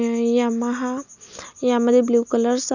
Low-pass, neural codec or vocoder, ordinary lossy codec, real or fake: 7.2 kHz; none; none; real